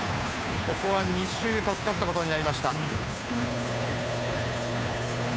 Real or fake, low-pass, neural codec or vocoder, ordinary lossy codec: fake; none; codec, 16 kHz, 2 kbps, FunCodec, trained on Chinese and English, 25 frames a second; none